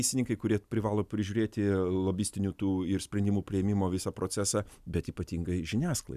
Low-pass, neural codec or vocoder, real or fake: 14.4 kHz; none; real